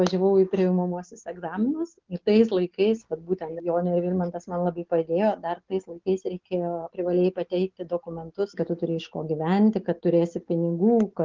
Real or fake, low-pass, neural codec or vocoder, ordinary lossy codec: real; 7.2 kHz; none; Opus, 32 kbps